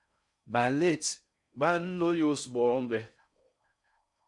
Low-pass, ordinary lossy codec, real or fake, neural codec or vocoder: 10.8 kHz; MP3, 96 kbps; fake; codec, 16 kHz in and 24 kHz out, 0.6 kbps, FocalCodec, streaming, 4096 codes